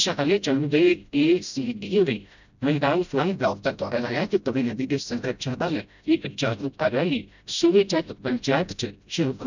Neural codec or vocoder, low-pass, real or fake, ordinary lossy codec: codec, 16 kHz, 0.5 kbps, FreqCodec, smaller model; 7.2 kHz; fake; none